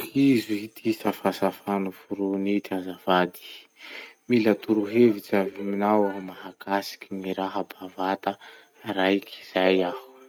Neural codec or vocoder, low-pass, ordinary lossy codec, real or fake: none; 19.8 kHz; none; real